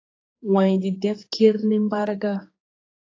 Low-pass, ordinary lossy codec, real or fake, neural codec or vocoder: 7.2 kHz; AAC, 32 kbps; fake; codec, 16 kHz, 4 kbps, X-Codec, HuBERT features, trained on general audio